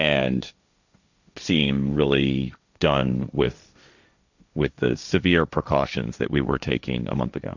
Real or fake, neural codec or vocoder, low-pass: fake; codec, 16 kHz, 1.1 kbps, Voila-Tokenizer; 7.2 kHz